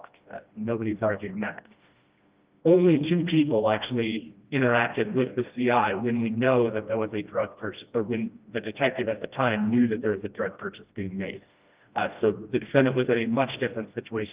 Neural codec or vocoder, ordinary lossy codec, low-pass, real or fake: codec, 16 kHz, 1 kbps, FreqCodec, smaller model; Opus, 24 kbps; 3.6 kHz; fake